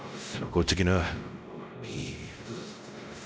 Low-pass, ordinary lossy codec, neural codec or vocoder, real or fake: none; none; codec, 16 kHz, 0.5 kbps, X-Codec, WavLM features, trained on Multilingual LibriSpeech; fake